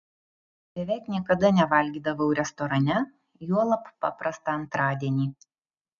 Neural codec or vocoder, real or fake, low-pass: none; real; 7.2 kHz